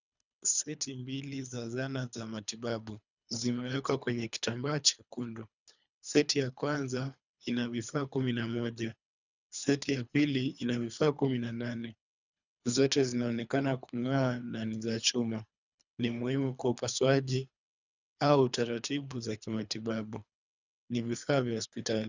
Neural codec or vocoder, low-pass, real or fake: codec, 24 kHz, 3 kbps, HILCodec; 7.2 kHz; fake